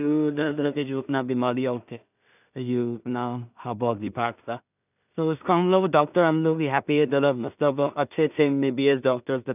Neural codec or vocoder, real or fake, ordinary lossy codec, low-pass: codec, 16 kHz in and 24 kHz out, 0.4 kbps, LongCat-Audio-Codec, two codebook decoder; fake; none; 3.6 kHz